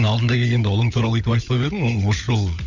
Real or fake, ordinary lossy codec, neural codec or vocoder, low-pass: fake; none; codec, 16 kHz, 16 kbps, FunCodec, trained on LibriTTS, 50 frames a second; 7.2 kHz